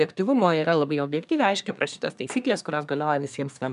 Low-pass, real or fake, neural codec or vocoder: 10.8 kHz; fake; codec, 24 kHz, 1 kbps, SNAC